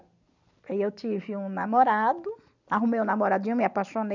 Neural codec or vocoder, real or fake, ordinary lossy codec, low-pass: none; real; none; 7.2 kHz